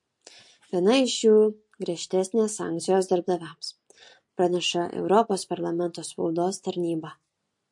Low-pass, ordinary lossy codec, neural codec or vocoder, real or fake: 10.8 kHz; MP3, 48 kbps; none; real